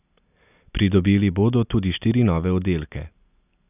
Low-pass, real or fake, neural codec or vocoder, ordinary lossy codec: 3.6 kHz; real; none; none